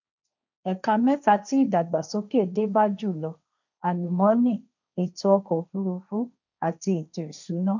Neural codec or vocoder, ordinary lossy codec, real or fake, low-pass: codec, 16 kHz, 1.1 kbps, Voila-Tokenizer; none; fake; 7.2 kHz